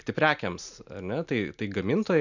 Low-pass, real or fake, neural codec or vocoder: 7.2 kHz; real; none